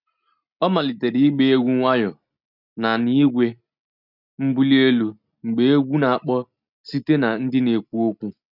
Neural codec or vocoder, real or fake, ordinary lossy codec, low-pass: none; real; none; 5.4 kHz